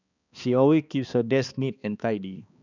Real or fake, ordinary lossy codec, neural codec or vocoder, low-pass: fake; none; codec, 16 kHz, 2 kbps, X-Codec, HuBERT features, trained on balanced general audio; 7.2 kHz